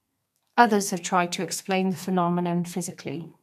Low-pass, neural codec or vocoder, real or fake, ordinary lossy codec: 14.4 kHz; codec, 32 kHz, 1.9 kbps, SNAC; fake; none